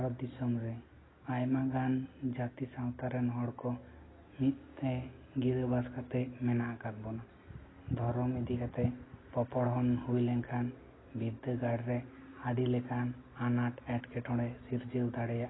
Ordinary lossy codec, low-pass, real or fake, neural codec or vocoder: AAC, 16 kbps; 7.2 kHz; real; none